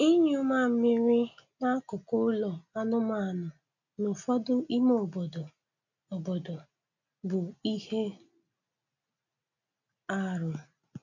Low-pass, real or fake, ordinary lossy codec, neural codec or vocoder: 7.2 kHz; real; none; none